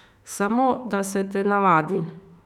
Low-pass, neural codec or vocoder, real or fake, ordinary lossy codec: 19.8 kHz; autoencoder, 48 kHz, 32 numbers a frame, DAC-VAE, trained on Japanese speech; fake; none